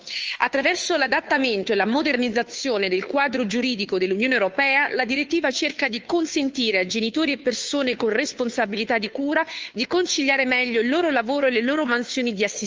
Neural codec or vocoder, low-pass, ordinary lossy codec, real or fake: codec, 16 kHz, 2 kbps, FunCodec, trained on Chinese and English, 25 frames a second; 7.2 kHz; Opus, 24 kbps; fake